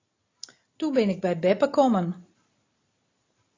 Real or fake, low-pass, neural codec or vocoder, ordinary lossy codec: real; 7.2 kHz; none; AAC, 32 kbps